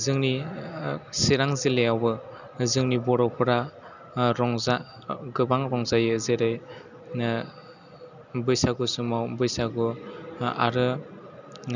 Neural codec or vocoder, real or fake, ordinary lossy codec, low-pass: none; real; none; 7.2 kHz